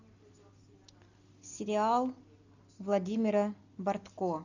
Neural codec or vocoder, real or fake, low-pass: none; real; 7.2 kHz